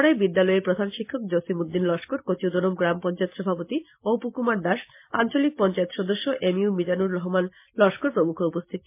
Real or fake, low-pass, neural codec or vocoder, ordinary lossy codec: real; 3.6 kHz; none; MP3, 24 kbps